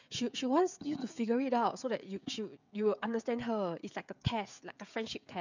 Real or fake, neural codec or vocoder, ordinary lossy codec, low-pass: fake; codec, 16 kHz, 16 kbps, FreqCodec, smaller model; none; 7.2 kHz